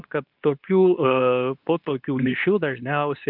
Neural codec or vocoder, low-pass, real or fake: codec, 24 kHz, 0.9 kbps, WavTokenizer, medium speech release version 2; 5.4 kHz; fake